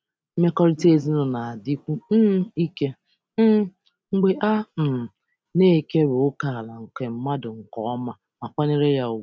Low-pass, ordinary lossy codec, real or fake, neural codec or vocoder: none; none; real; none